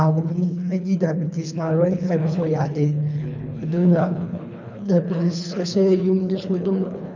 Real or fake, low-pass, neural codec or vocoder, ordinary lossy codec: fake; 7.2 kHz; codec, 24 kHz, 3 kbps, HILCodec; none